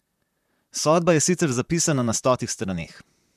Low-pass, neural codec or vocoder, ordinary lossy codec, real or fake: 14.4 kHz; vocoder, 44.1 kHz, 128 mel bands, Pupu-Vocoder; none; fake